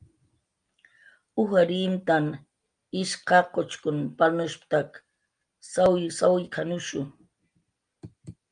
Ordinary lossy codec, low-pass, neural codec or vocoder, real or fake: Opus, 32 kbps; 9.9 kHz; none; real